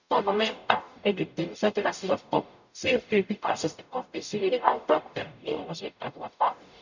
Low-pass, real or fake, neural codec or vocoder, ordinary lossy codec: 7.2 kHz; fake; codec, 44.1 kHz, 0.9 kbps, DAC; none